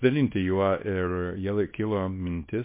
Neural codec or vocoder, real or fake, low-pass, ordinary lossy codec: codec, 24 kHz, 0.9 kbps, WavTokenizer, small release; fake; 3.6 kHz; MP3, 24 kbps